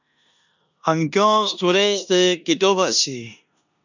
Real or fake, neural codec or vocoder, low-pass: fake; codec, 16 kHz in and 24 kHz out, 0.9 kbps, LongCat-Audio-Codec, four codebook decoder; 7.2 kHz